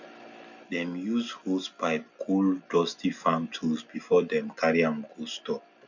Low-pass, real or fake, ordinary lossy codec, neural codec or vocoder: 7.2 kHz; real; none; none